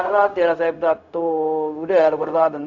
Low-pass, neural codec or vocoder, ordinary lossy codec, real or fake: 7.2 kHz; codec, 16 kHz, 0.4 kbps, LongCat-Audio-Codec; none; fake